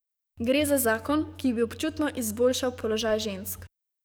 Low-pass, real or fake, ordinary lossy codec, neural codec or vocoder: none; fake; none; codec, 44.1 kHz, 7.8 kbps, DAC